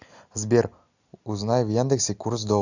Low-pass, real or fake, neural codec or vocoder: 7.2 kHz; real; none